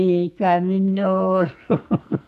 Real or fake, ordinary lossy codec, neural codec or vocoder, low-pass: fake; none; codec, 44.1 kHz, 2.6 kbps, SNAC; 14.4 kHz